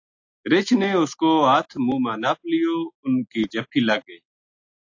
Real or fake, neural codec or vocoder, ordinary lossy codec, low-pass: real; none; AAC, 48 kbps; 7.2 kHz